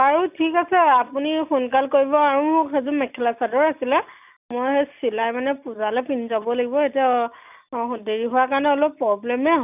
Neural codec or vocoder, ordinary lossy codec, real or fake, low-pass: none; none; real; 3.6 kHz